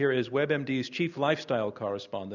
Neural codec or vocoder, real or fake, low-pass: none; real; 7.2 kHz